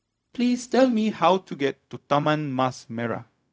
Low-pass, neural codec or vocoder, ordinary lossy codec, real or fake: none; codec, 16 kHz, 0.4 kbps, LongCat-Audio-Codec; none; fake